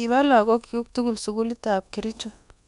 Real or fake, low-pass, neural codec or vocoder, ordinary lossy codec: fake; 10.8 kHz; codec, 24 kHz, 1.2 kbps, DualCodec; none